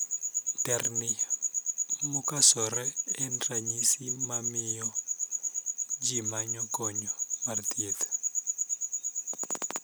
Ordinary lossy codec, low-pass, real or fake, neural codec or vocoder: none; none; real; none